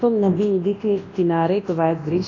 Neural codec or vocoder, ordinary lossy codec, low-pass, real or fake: codec, 24 kHz, 0.9 kbps, WavTokenizer, large speech release; AAC, 32 kbps; 7.2 kHz; fake